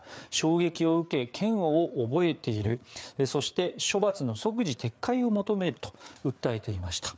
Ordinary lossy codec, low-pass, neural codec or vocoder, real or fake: none; none; codec, 16 kHz, 4 kbps, FreqCodec, larger model; fake